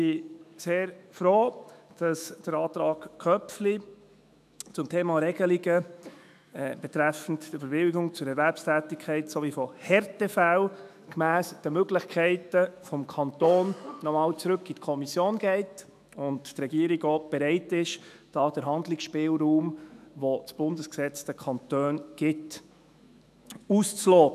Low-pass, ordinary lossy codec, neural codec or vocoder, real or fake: 14.4 kHz; none; autoencoder, 48 kHz, 128 numbers a frame, DAC-VAE, trained on Japanese speech; fake